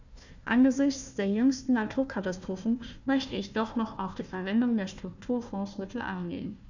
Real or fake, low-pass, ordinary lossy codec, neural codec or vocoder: fake; 7.2 kHz; none; codec, 16 kHz, 1 kbps, FunCodec, trained on Chinese and English, 50 frames a second